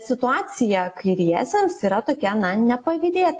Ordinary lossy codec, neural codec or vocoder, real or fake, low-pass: AAC, 48 kbps; none; real; 10.8 kHz